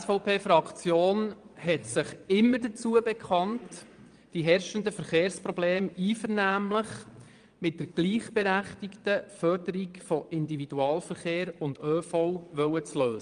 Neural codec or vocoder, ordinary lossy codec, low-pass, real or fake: vocoder, 22.05 kHz, 80 mel bands, Vocos; Opus, 32 kbps; 9.9 kHz; fake